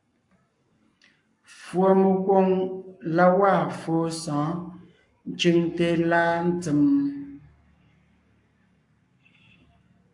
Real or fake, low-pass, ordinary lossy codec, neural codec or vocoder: fake; 10.8 kHz; AAC, 64 kbps; codec, 44.1 kHz, 7.8 kbps, Pupu-Codec